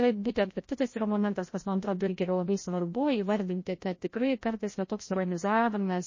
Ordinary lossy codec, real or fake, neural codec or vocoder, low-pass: MP3, 32 kbps; fake; codec, 16 kHz, 0.5 kbps, FreqCodec, larger model; 7.2 kHz